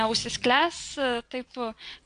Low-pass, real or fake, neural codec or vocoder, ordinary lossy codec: 9.9 kHz; fake; vocoder, 22.05 kHz, 80 mel bands, WaveNeXt; AAC, 64 kbps